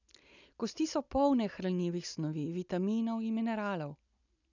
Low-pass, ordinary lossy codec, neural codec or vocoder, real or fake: 7.2 kHz; none; none; real